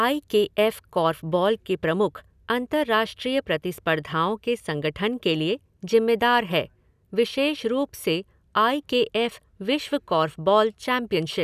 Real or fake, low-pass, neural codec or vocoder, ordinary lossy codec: real; 14.4 kHz; none; none